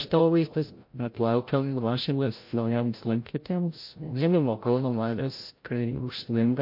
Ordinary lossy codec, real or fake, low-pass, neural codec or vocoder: MP3, 32 kbps; fake; 5.4 kHz; codec, 16 kHz, 0.5 kbps, FreqCodec, larger model